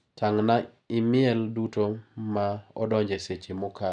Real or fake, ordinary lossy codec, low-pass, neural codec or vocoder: real; none; 9.9 kHz; none